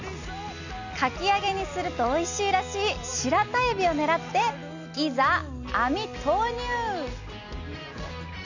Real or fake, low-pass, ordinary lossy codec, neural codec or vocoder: real; 7.2 kHz; none; none